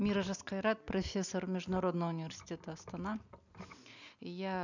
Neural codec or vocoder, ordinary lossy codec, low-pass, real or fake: none; none; 7.2 kHz; real